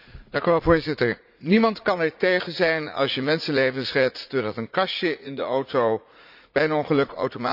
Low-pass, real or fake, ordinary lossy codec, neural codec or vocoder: 5.4 kHz; fake; none; vocoder, 44.1 kHz, 80 mel bands, Vocos